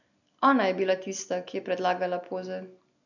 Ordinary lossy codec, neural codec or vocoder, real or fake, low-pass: none; none; real; 7.2 kHz